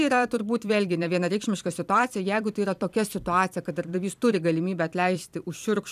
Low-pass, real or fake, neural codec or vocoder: 14.4 kHz; fake; vocoder, 44.1 kHz, 128 mel bands every 256 samples, BigVGAN v2